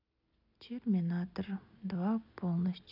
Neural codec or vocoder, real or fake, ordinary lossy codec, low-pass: none; real; none; 5.4 kHz